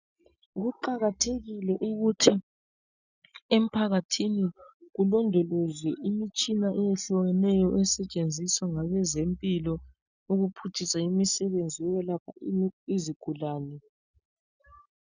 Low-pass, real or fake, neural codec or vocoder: 7.2 kHz; real; none